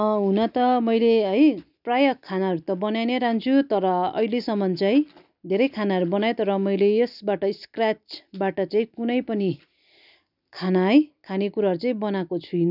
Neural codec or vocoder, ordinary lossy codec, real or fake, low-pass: none; none; real; 5.4 kHz